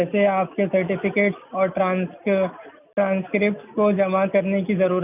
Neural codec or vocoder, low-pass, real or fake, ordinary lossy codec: none; 3.6 kHz; real; none